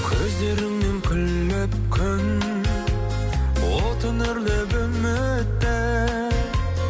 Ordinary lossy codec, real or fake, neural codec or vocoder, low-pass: none; real; none; none